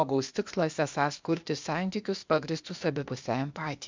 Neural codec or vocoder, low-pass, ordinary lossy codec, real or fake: codec, 16 kHz, 0.8 kbps, ZipCodec; 7.2 kHz; MP3, 64 kbps; fake